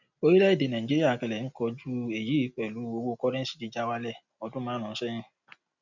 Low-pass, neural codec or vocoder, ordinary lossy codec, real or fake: 7.2 kHz; none; none; real